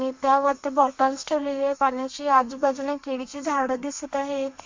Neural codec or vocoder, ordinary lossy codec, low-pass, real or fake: codec, 32 kHz, 1.9 kbps, SNAC; MP3, 48 kbps; 7.2 kHz; fake